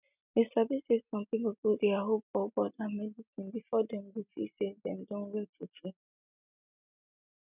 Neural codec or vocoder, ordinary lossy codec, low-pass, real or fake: none; none; 3.6 kHz; real